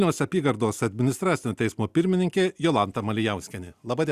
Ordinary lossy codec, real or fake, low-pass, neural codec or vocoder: Opus, 64 kbps; real; 14.4 kHz; none